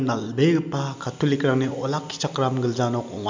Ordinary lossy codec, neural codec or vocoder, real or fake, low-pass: MP3, 64 kbps; none; real; 7.2 kHz